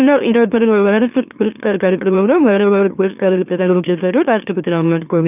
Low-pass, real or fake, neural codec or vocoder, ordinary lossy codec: 3.6 kHz; fake; autoencoder, 44.1 kHz, a latent of 192 numbers a frame, MeloTTS; none